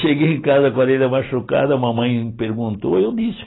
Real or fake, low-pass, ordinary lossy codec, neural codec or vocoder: real; 7.2 kHz; AAC, 16 kbps; none